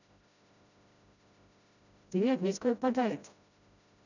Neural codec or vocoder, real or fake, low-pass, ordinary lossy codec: codec, 16 kHz, 0.5 kbps, FreqCodec, smaller model; fake; 7.2 kHz; none